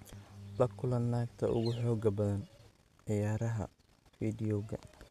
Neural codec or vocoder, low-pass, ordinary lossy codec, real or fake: none; 14.4 kHz; none; real